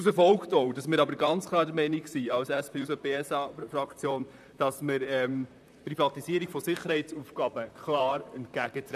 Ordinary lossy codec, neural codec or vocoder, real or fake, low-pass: none; vocoder, 44.1 kHz, 128 mel bands, Pupu-Vocoder; fake; 14.4 kHz